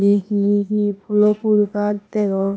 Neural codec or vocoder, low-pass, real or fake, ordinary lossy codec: codec, 16 kHz, 0.8 kbps, ZipCodec; none; fake; none